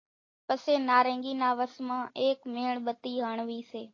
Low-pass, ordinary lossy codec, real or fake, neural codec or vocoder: 7.2 kHz; AAC, 32 kbps; real; none